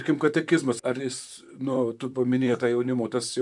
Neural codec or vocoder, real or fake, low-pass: vocoder, 44.1 kHz, 128 mel bands, Pupu-Vocoder; fake; 10.8 kHz